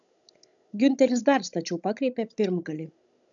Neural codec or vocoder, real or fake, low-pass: codec, 16 kHz, 16 kbps, FunCodec, trained on Chinese and English, 50 frames a second; fake; 7.2 kHz